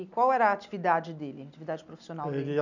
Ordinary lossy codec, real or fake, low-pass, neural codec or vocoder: none; real; 7.2 kHz; none